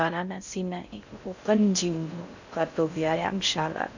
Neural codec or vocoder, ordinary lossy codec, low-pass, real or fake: codec, 16 kHz in and 24 kHz out, 0.6 kbps, FocalCodec, streaming, 2048 codes; none; 7.2 kHz; fake